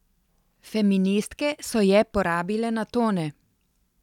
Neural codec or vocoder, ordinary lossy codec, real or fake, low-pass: none; none; real; 19.8 kHz